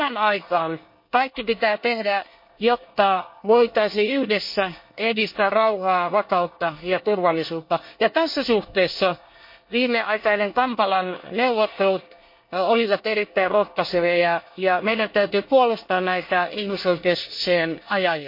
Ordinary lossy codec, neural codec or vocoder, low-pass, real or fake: MP3, 32 kbps; codec, 24 kHz, 1 kbps, SNAC; 5.4 kHz; fake